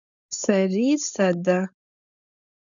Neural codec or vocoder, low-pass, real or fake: codec, 16 kHz, 4.8 kbps, FACodec; 7.2 kHz; fake